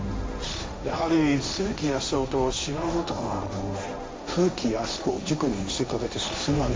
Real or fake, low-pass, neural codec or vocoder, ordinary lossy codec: fake; none; codec, 16 kHz, 1.1 kbps, Voila-Tokenizer; none